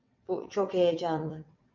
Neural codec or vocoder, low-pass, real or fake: vocoder, 22.05 kHz, 80 mel bands, WaveNeXt; 7.2 kHz; fake